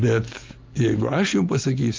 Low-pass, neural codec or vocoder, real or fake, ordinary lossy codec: 7.2 kHz; none; real; Opus, 32 kbps